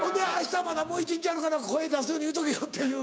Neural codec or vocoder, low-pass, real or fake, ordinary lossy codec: codec, 16 kHz, 6 kbps, DAC; none; fake; none